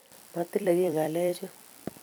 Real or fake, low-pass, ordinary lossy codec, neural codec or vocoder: fake; none; none; vocoder, 44.1 kHz, 128 mel bands every 256 samples, BigVGAN v2